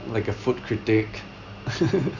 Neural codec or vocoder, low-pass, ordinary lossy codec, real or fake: none; 7.2 kHz; Opus, 64 kbps; real